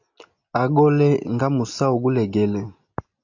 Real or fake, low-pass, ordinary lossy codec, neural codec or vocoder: real; 7.2 kHz; AAC, 48 kbps; none